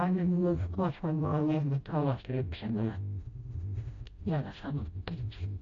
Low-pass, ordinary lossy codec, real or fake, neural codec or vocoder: 7.2 kHz; Opus, 64 kbps; fake; codec, 16 kHz, 0.5 kbps, FreqCodec, smaller model